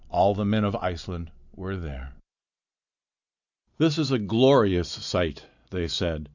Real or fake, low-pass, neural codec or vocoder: real; 7.2 kHz; none